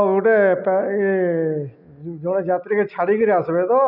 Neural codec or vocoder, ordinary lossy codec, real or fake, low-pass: none; none; real; 5.4 kHz